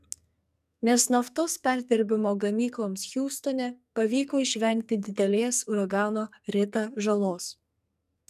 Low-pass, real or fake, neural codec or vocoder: 14.4 kHz; fake; codec, 44.1 kHz, 2.6 kbps, SNAC